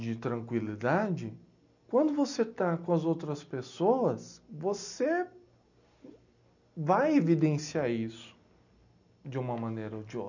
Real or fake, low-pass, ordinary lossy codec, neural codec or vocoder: real; 7.2 kHz; none; none